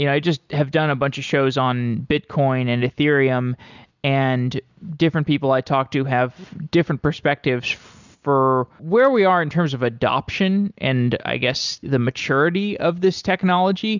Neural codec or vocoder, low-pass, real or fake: none; 7.2 kHz; real